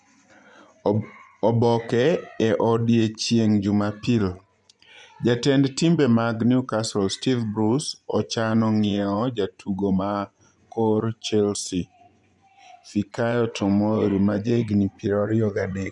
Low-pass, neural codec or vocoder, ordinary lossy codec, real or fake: 10.8 kHz; vocoder, 24 kHz, 100 mel bands, Vocos; none; fake